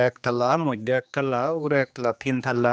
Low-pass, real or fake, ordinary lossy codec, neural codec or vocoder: none; fake; none; codec, 16 kHz, 2 kbps, X-Codec, HuBERT features, trained on general audio